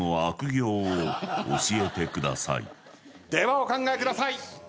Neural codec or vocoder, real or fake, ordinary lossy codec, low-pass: none; real; none; none